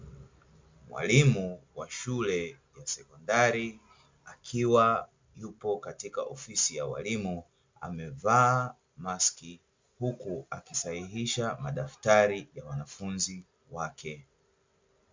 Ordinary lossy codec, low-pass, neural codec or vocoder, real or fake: MP3, 64 kbps; 7.2 kHz; none; real